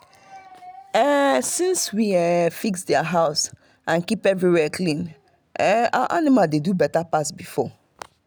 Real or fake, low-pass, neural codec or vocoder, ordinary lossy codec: real; none; none; none